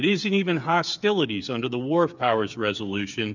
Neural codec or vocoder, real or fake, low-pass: codec, 16 kHz, 8 kbps, FreqCodec, smaller model; fake; 7.2 kHz